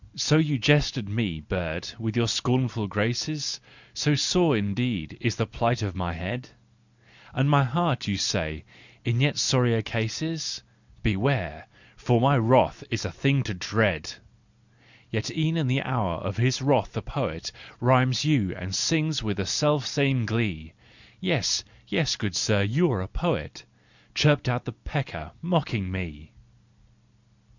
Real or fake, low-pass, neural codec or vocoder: real; 7.2 kHz; none